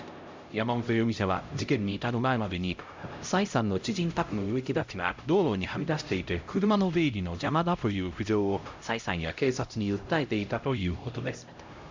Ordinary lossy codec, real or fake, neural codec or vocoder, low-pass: MP3, 64 kbps; fake; codec, 16 kHz, 0.5 kbps, X-Codec, HuBERT features, trained on LibriSpeech; 7.2 kHz